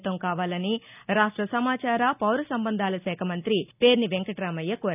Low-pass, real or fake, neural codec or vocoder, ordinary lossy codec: 3.6 kHz; real; none; none